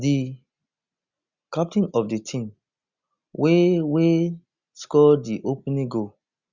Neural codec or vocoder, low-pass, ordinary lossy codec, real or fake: none; 7.2 kHz; Opus, 64 kbps; real